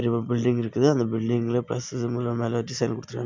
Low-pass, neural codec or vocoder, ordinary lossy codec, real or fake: 7.2 kHz; none; MP3, 64 kbps; real